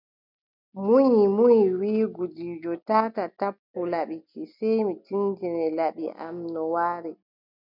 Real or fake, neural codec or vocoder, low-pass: real; none; 5.4 kHz